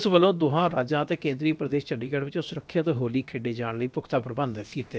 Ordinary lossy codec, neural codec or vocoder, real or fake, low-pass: none; codec, 16 kHz, about 1 kbps, DyCAST, with the encoder's durations; fake; none